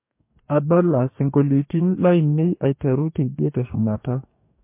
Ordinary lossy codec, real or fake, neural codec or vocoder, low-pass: MP3, 24 kbps; fake; codec, 44.1 kHz, 2.6 kbps, DAC; 3.6 kHz